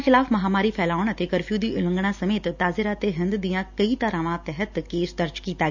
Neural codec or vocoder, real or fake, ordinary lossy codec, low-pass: none; real; none; 7.2 kHz